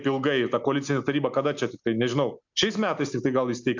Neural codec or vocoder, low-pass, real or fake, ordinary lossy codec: none; 7.2 kHz; real; MP3, 64 kbps